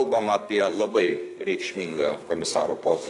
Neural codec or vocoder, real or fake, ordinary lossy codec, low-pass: codec, 32 kHz, 1.9 kbps, SNAC; fake; AAC, 48 kbps; 10.8 kHz